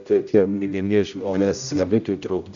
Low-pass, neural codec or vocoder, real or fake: 7.2 kHz; codec, 16 kHz, 0.5 kbps, X-Codec, HuBERT features, trained on general audio; fake